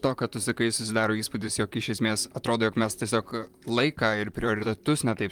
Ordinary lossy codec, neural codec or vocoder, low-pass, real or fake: Opus, 32 kbps; vocoder, 44.1 kHz, 128 mel bands, Pupu-Vocoder; 19.8 kHz; fake